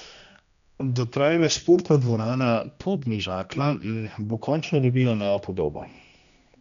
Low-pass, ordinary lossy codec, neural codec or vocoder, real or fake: 7.2 kHz; none; codec, 16 kHz, 1 kbps, X-Codec, HuBERT features, trained on general audio; fake